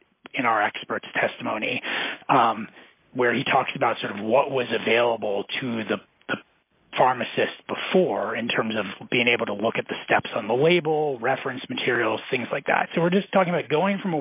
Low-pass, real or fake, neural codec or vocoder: 3.6 kHz; real; none